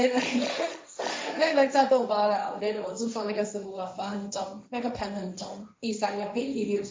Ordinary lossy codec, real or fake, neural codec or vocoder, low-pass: none; fake; codec, 16 kHz, 1.1 kbps, Voila-Tokenizer; none